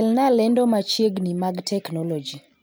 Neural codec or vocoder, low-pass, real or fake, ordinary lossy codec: none; none; real; none